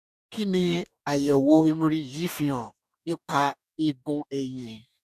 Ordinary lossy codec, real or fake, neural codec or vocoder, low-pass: none; fake; codec, 44.1 kHz, 2.6 kbps, DAC; 14.4 kHz